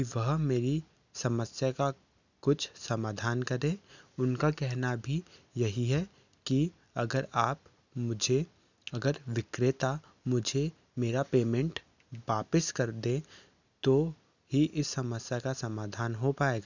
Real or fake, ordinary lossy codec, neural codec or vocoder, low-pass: real; none; none; 7.2 kHz